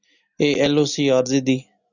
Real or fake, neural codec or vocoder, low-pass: real; none; 7.2 kHz